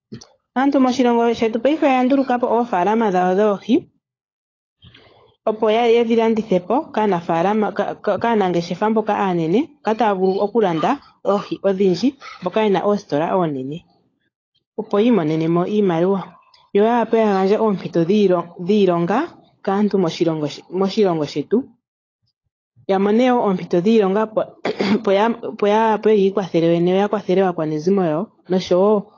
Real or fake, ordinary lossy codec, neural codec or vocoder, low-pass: fake; AAC, 32 kbps; codec, 16 kHz, 16 kbps, FunCodec, trained on LibriTTS, 50 frames a second; 7.2 kHz